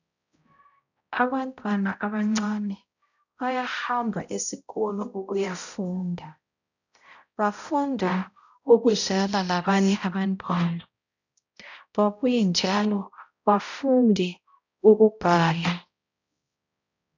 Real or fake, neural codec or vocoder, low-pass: fake; codec, 16 kHz, 0.5 kbps, X-Codec, HuBERT features, trained on balanced general audio; 7.2 kHz